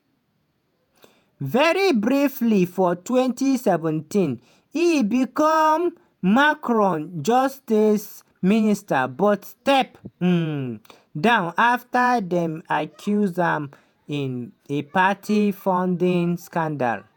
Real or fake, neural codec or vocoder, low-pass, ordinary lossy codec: fake; vocoder, 48 kHz, 128 mel bands, Vocos; 19.8 kHz; none